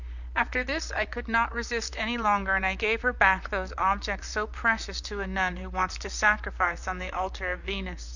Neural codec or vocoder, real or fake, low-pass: vocoder, 44.1 kHz, 128 mel bands, Pupu-Vocoder; fake; 7.2 kHz